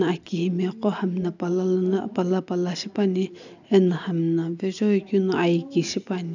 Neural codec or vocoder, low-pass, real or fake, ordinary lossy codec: none; 7.2 kHz; real; none